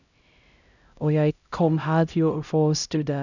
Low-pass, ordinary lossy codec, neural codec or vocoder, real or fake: 7.2 kHz; none; codec, 16 kHz, 0.5 kbps, X-Codec, HuBERT features, trained on LibriSpeech; fake